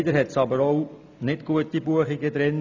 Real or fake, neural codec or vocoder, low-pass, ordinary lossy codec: real; none; 7.2 kHz; none